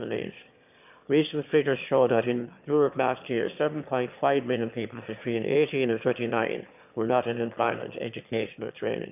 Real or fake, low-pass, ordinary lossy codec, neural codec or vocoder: fake; 3.6 kHz; none; autoencoder, 22.05 kHz, a latent of 192 numbers a frame, VITS, trained on one speaker